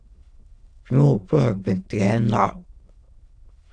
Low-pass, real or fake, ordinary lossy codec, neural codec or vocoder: 9.9 kHz; fake; AAC, 48 kbps; autoencoder, 22.05 kHz, a latent of 192 numbers a frame, VITS, trained on many speakers